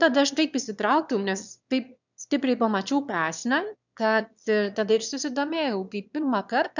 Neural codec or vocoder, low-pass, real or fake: autoencoder, 22.05 kHz, a latent of 192 numbers a frame, VITS, trained on one speaker; 7.2 kHz; fake